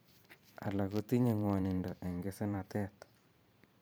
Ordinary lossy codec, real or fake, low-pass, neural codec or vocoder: none; fake; none; vocoder, 44.1 kHz, 128 mel bands every 512 samples, BigVGAN v2